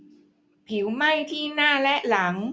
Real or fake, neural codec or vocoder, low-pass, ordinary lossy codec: real; none; none; none